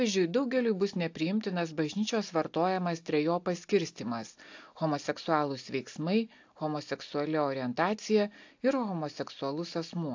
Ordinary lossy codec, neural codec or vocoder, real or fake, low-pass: AAC, 48 kbps; none; real; 7.2 kHz